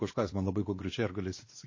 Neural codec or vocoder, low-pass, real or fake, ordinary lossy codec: codec, 16 kHz, 2 kbps, X-Codec, WavLM features, trained on Multilingual LibriSpeech; 7.2 kHz; fake; MP3, 32 kbps